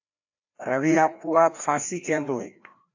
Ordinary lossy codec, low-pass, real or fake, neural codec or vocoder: AAC, 48 kbps; 7.2 kHz; fake; codec, 16 kHz, 1 kbps, FreqCodec, larger model